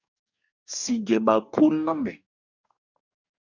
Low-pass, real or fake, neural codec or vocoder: 7.2 kHz; fake; codec, 44.1 kHz, 2.6 kbps, DAC